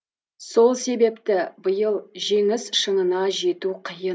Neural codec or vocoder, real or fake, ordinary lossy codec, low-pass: none; real; none; none